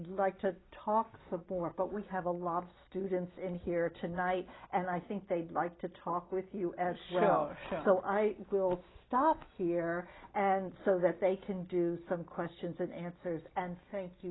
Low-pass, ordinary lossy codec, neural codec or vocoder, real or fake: 7.2 kHz; AAC, 16 kbps; none; real